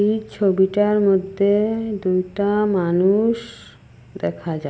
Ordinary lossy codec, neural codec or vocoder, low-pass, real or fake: none; none; none; real